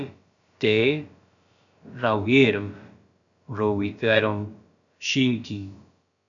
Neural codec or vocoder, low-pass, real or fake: codec, 16 kHz, about 1 kbps, DyCAST, with the encoder's durations; 7.2 kHz; fake